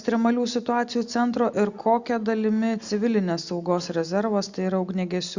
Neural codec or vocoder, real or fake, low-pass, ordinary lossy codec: none; real; 7.2 kHz; Opus, 64 kbps